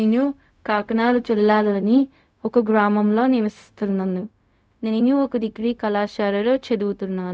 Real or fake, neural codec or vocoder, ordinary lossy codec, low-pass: fake; codec, 16 kHz, 0.4 kbps, LongCat-Audio-Codec; none; none